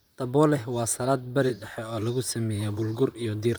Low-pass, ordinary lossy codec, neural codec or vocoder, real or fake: none; none; vocoder, 44.1 kHz, 128 mel bands, Pupu-Vocoder; fake